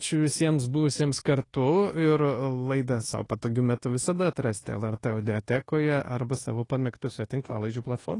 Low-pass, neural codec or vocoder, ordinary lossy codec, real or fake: 10.8 kHz; codec, 24 kHz, 1.2 kbps, DualCodec; AAC, 32 kbps; fake